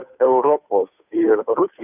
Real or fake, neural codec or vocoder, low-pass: fake; codec, 16 kHz, 2 kbps, FunCodec, trained on Chinese and English, 25 frames a second; 3.6 kHz